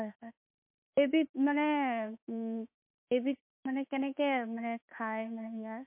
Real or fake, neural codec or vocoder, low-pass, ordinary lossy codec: fake; autoencoder, 48 kHz, 32 numbers a frame, DAC-VAE, trained on Japanese speech; 3.6 kHz; MP3, 32 kbps